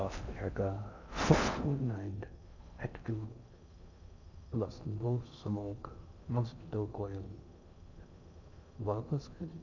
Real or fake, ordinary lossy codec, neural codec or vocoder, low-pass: fake; none; codec, 16 kHz in and 24 kHz out, 0.6 kbps, FocalCodec, streaming, 4096 codes; 7.2 kHz